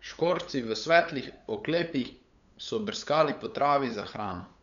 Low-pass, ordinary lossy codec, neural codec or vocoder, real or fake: 7.2 kHz; none; codec, 16 kHz, 8 kbps, FunCodec, trained on LibriTTS, 25 frames a second; fake